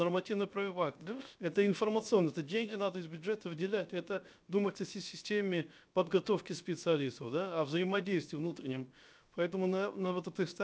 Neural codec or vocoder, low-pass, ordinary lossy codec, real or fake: codec, 16 kHz, 0.7 kbps, FocalCodec; none; none; fake